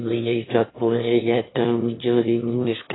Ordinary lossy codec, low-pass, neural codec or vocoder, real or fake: AAC, 16 kbps; 7.2 kHz; autoencoder, 22.05 kHz, a latent of 192 numbers a frame, VITS, trained on one speaker; fake